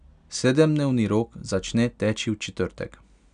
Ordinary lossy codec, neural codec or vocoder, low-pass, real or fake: none; none; 9.9 kHz; real